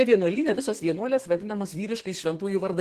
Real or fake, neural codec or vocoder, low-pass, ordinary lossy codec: fake; codec, 32 kHz, 1.9 kbps, SNAC; 14.4 kHz; Opus, 16 kbps